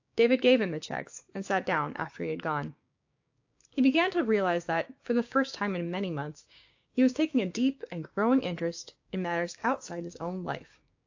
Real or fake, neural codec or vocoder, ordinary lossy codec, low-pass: fake; codec, 16 kHz, 6 kbps, DAC; AAC, 48 kbps; 7.2 kHz